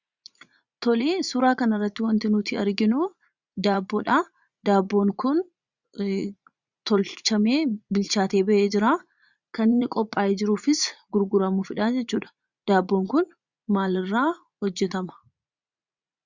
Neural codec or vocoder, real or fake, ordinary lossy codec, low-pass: vocoder, 44.1 kHz, 80 mel bands, Vocos; fake; Opus, 64 kbps; 7.2 kHz